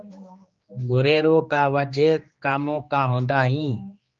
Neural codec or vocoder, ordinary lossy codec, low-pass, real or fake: codec, 16 kHz, 2 kbps, X-Codec, HuBERT features, trained on general audio; Opus, 24 kbps; 7.2 kHz; fake